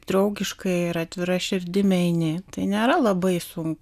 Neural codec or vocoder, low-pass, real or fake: none; 14.4 kHz; real